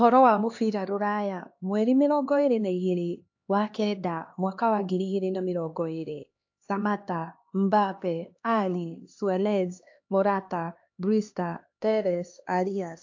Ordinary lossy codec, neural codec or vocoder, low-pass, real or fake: AAC, 48 kbps; codec, 16 kHz, 2 kbps, X-Codec, HuBERT features, trained on LibriSpeech; 7.2 kHz; fake